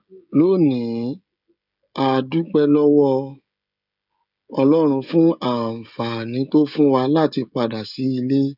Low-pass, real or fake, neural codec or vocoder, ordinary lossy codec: 5.4 kHz; fake; codec, 16 kHz, 16 kbps, FreqCodec, smaller model; none